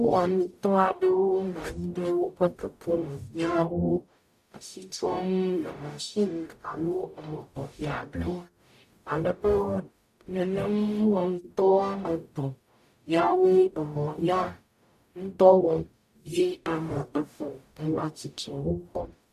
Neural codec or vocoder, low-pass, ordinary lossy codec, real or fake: codec, 44.1 kHz, 0.9 kbps, DAC; 14.4 kHz; AAC, 96 kbps; fake